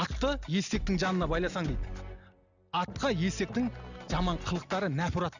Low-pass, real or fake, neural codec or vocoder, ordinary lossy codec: 7.2 kHz; real; none; none